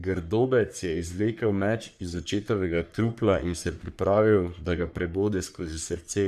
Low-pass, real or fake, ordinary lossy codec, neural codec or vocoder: 14.4 kHz; fake; none; codec, 44.1 kHz, 3.4 kbps, Pupu-Codec